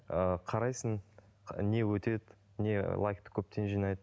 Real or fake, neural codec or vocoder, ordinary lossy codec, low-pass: real; none; none; none